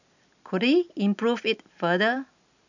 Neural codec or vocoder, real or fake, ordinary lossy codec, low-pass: none; real; none; 7.2 kHz